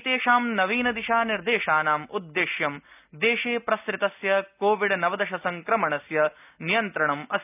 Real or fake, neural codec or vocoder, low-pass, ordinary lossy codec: real; none; 3.6 kHz; none